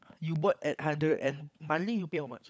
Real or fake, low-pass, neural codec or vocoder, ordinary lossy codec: fake; none; codec, 16 kHz, 16 kbps, FunCodec, trained on LibriTTS, 50 frames a second; none